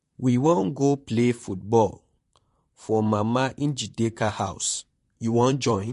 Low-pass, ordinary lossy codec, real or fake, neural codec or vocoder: 14.4 kHz; MP3, 48 kbps; fake; vocoder, 44.1 kHz, 128 mel bands, Pupu-Vocoder